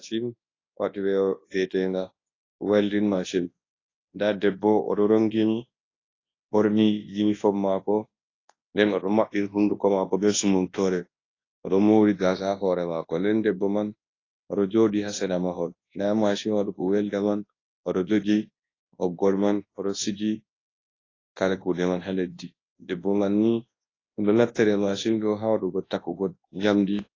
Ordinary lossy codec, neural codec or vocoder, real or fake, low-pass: AAC, 32 kbps; codec, 24 kHz, 0.9 kbps, WavTokenizer, large speech release; fake; 7.2 kHz